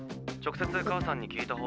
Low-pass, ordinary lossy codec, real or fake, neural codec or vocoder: none; none; real; none